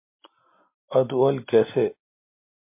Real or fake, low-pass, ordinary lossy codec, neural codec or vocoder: real; 3.6 kHz; MP3, 24 kbps; none